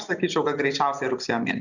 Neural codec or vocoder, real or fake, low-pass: none; real; 7.2 kHz